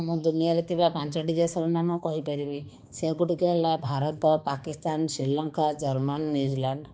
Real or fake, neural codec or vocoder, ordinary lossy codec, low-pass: fake; codec, 16 kHz, 2 kbps, X-Codec, HuBERT features, trained on balanced general audio; none; none